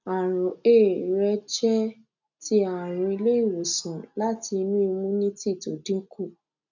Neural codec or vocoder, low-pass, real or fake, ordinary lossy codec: none; 7.2 kHz; real; none